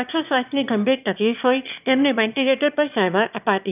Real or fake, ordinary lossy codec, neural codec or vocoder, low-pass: fake; none; autoencoder, 22.05 kHz, a latent of 192 numbers a frame, VITS, trained on one speaker; 3.6 kHz